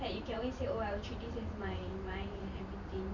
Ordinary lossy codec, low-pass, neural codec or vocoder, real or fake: none; 7.2 kHz; vocoder, 44.1 kHz, 128 mel bands every 512 samples, BigVGAN v2; fake